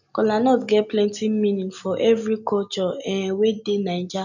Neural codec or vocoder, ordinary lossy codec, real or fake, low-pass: none; none; real; 7.2 kHz